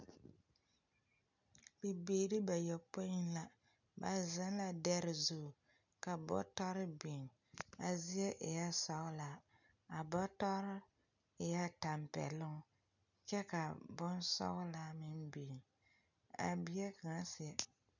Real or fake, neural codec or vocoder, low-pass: real; none; 7.2 kHz